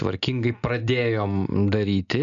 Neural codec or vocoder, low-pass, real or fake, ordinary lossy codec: none; 7.2 kHz; real; MP3, 96 kbps